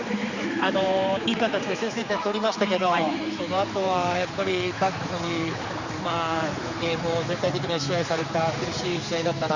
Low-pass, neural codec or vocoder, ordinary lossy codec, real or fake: 7.2 kHz; codec, 16 kHz, 4 kbps, X-Codec, HuBERT features, trained on general audio; Opus, 64 kbps; fake